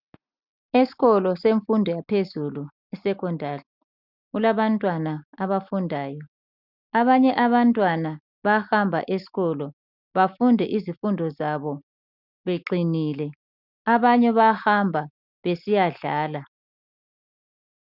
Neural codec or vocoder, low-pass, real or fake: none; 5.4 kHz; real